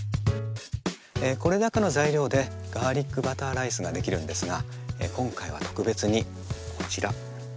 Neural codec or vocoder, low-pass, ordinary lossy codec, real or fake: none; none; none; real